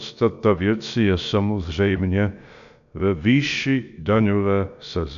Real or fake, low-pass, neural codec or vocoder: fake; 7.2 kHz; codec, 16 kHz, about 1 kbps, DyCAST, with the encoder's durations